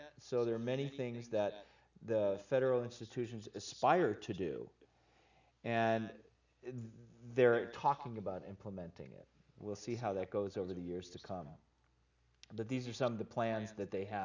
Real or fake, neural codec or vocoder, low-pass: real; none; 7.2 kHz